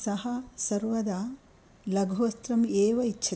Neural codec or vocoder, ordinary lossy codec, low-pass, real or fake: none; none; none; real